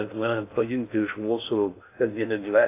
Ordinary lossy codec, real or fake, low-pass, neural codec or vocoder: AAC, 24 kbps; fake; 3.6 kHz; codec, 16 kHz in and 24 kHz out, 0.6 kbps, FocalCodec, streaming, 2048 codes